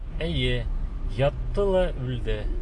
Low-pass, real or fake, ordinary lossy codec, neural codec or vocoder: 10.8 kHz; real; AAC, 64 kbps; none